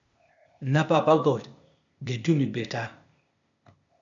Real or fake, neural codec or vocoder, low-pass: fake; codec, 16 kHz, 0.8 kbps, ZipCodec; 7.2 kHz